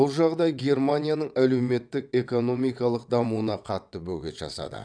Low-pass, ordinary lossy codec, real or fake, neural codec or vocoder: 9.9 kHz; none; fake; vocoder, 22.05 kHz, 80 mel bands, WaveNeXt